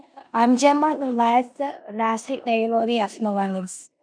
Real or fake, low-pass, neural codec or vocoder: fake; 9.9 kHz; codec, 16 kHz in and 24 kHz out, 0.9 kbps, LongCat-Audio-Codec, four codebook decoder